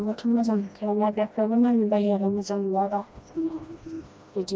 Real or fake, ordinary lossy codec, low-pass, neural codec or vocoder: fake; none; none; codec, 16 kHz, 1 kbps, FreqCodec, smaller model